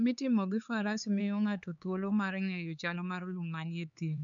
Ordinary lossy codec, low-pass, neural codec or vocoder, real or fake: none; 7.2 kHz; codec, 16 kHz, 4 kbps, X-Codec, HuBERT features, trained on LibriSpeech; fake